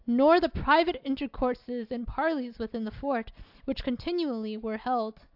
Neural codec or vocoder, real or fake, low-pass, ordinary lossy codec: none; real; 5.4 kHz; AAC, 48 kbps